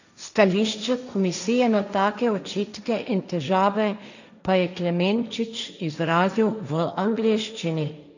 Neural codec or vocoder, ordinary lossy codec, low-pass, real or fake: codec, 16 kHz, 1.1 kbps, Voila-Tokenizer; none; 7.2 kHz; fake